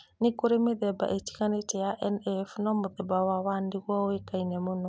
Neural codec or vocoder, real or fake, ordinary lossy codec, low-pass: none; real; none; none